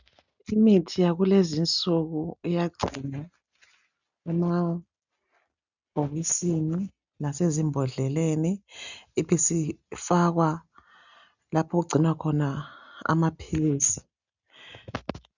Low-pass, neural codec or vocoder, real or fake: 7.2 kHz; none; real